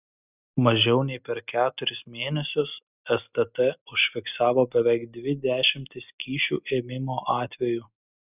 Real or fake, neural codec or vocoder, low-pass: real; none; 3.6 kHz